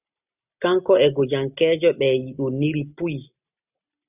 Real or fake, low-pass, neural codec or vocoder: real; 3.6 kHz; none